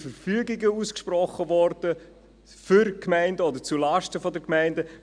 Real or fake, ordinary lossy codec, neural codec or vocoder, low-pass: real; none; none; 9.9 kHz